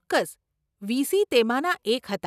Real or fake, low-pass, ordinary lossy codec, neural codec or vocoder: real; 14.4 kHz; none; none